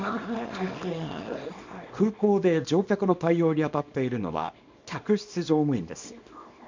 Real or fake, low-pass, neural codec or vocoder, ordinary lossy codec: fake; 7.2 kHz; codec, 24 kHz, 0.9 kbps, WavTokenizer, small release; MP3, 64 kbps